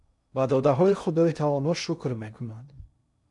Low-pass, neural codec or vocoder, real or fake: 10.8 kHz; codec, 16 kHz in and 24 kHz out, 0.6 kbps, FocalCodec, streaming, 2048 codes; fake